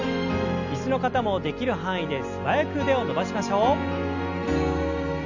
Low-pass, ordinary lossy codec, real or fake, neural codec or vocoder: 7.2 kHz; none; real; none